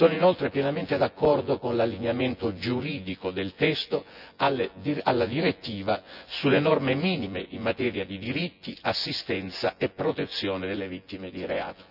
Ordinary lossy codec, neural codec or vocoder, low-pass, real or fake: none; vocoder, 24 kHz, 100 mel bands, Vocos; 5.4 kHz; fake